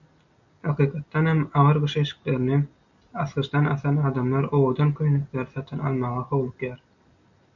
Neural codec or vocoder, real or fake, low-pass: none; real; 7.2 kHz